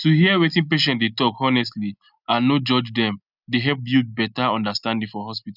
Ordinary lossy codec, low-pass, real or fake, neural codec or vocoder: none; 5.4 kHz; real; none